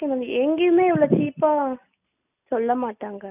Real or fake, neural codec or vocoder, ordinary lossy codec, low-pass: real; none; none; 3.6 kHz